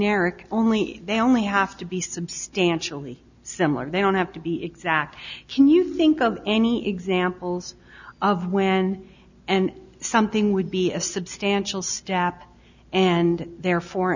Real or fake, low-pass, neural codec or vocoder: real; 7.2 kHz; none